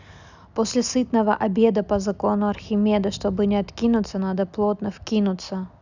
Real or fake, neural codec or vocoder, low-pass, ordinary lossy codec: real; none; 7.2 kHz; none